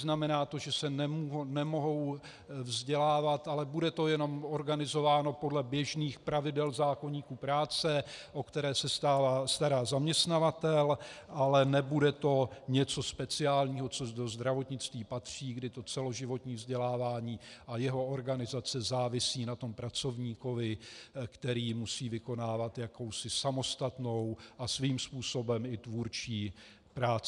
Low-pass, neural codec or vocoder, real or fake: 10.8 kHz; none; real